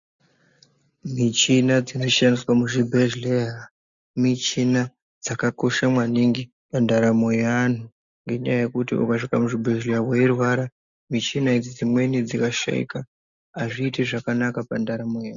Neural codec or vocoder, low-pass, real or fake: none; 7.2 kHz; real